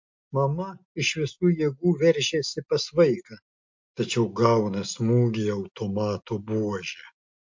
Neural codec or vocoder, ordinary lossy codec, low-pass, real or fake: none; MP3, 48 kbps; 7.2 kHz; real